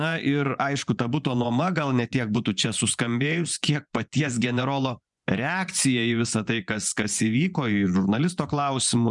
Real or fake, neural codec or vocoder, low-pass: fake; vocoder, 24 kHz, 100 mel bands, Vocos; 10.8 kHz